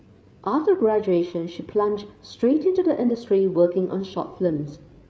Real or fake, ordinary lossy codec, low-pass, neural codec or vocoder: fake; none; none; codec, 16 kHz, 8 kbps, FreqCodec, larger model